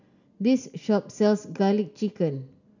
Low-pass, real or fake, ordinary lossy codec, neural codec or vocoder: 7.2 kHz; real; none; none